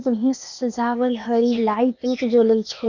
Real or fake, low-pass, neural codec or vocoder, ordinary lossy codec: fake; 7.2 kHz; codec, 16 kHz, 0.8 kbps, ZipCodec; none